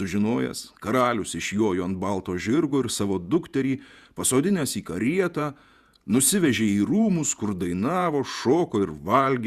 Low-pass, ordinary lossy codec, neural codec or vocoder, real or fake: 14.4 kHz; Opus, 64 kbps; vocoder, 48 kHz, 128 mel bands, Vocos; fake